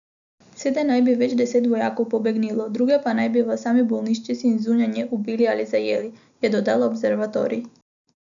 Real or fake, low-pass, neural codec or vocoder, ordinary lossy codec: real; 7.2 kHz; none; none